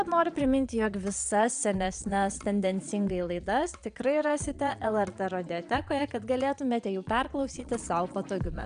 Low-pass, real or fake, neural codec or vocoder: 9.9 kHz; fake; vocoder, 22.05 kHz, 80 mel bands, Vocos